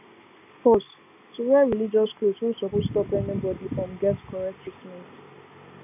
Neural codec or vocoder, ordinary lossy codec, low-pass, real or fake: none; none; 3.6 kHz; real